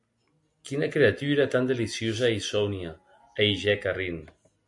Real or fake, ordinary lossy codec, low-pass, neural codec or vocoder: real; MP3, 64 kbps; 10.8 kHz; none